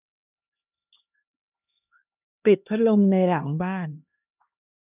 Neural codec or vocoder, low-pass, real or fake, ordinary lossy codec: codec, 16 kHz, 1 kbps, X-Codec, HuBERT features, trained on LibriSpeech; 3.6 kHz; fake; none